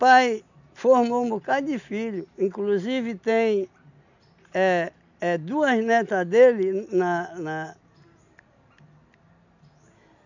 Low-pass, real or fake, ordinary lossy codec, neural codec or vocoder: 7.2 kHz; real; none; none